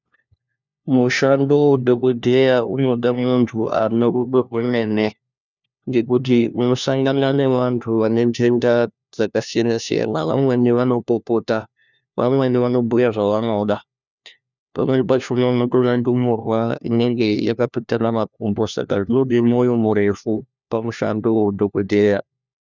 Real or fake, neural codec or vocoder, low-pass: fake; codec, 16 kHz, 1 kbps, FunCodec, trained on LibriTTS, 50 frames a second; 7.2 kHz